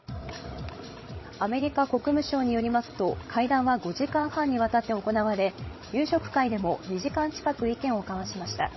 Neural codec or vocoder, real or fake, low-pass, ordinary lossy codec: codec, 16 kHz, 16 kbps, FreqCodec, larger model; fake; 7.2 kHz; MP3, 24 kbps